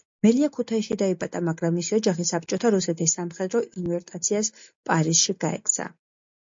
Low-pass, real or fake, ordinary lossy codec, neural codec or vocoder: 7.2 kHz; real; MP3, 48 kbps; none